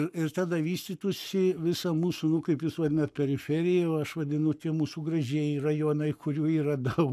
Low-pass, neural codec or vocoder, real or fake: 14.4 kHz; codec, 44.1 kHz, 7.8 kbps, Pupu-Codec; fake